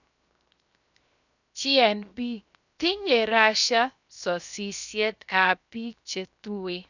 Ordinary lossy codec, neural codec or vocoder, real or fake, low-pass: none; codec, 16 kHz, 0.7 kbps, FocalCodec; fake; 7.2 kHz